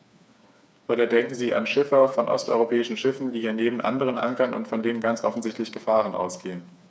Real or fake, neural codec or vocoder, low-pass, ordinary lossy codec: fake; codec, 16 kHz, 4 kbps, FreqCodec, smaller model; none; none